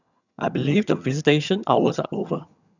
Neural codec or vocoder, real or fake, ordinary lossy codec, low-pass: vocoder, 22.05 kHz, 80 mel bands, HiFi-GAN; fake; none; 7.2 kHz